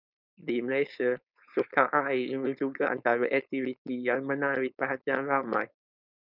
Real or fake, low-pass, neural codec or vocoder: fake; 5.4 kHz; codec, 16 kHz, 4.8 kbps, FACodec